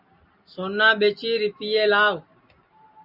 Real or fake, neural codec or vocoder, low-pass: real; none; 5.4 kHz